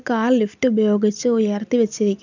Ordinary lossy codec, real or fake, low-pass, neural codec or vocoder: none; real; 7.2 kHz; none